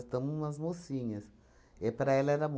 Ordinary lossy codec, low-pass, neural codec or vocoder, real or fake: none; none; none; real